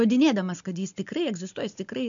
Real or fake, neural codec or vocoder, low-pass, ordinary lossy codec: real; none; 7.2 kHz; MP3, 64 kbps